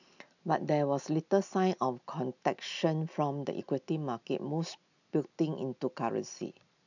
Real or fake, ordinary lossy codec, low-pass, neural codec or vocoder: real; none; 7.2 kHz; none